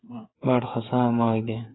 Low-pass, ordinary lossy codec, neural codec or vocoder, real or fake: 7.2 kHz; AAC, 16 kbps; codec, 16 kHz, 8 kbps, FreqCodec, smaller model; fake